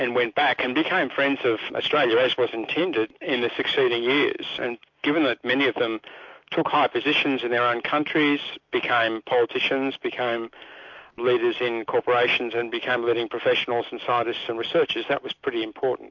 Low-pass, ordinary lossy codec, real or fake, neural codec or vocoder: 7.2 kHz; MP3, 48 kbps; real; none